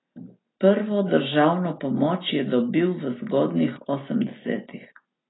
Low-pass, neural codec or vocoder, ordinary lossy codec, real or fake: 7.2 kHz; none; AAC, 16 kbps; real